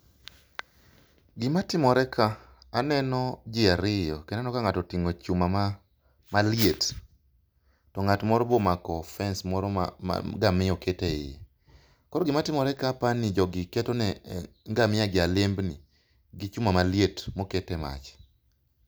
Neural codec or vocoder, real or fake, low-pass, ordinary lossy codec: none; real; none; none